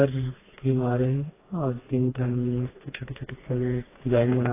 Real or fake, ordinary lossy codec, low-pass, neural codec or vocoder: fake; AAC, 16 kbps; 3.6 kHz; codec, 16 kHz, 2 kbps, FreqCodec, smaller model